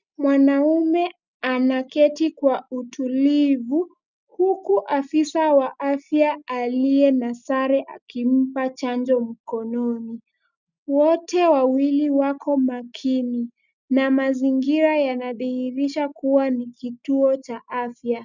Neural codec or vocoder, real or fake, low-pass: none; real; 7.2 kHz